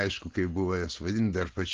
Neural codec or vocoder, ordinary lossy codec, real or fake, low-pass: none; Opus, 16 kbps; real; 7.2 kHz